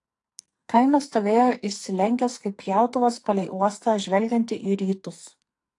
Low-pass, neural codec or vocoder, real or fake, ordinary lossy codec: 10.8 kHz; codec, 44.1 kHz, 2.6 kbps, SNAC; fake; AAC, 48 kbps